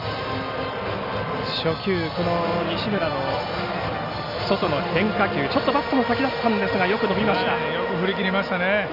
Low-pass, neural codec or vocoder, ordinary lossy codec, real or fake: 5.4 kHz; none; Opus, 64 kbps; real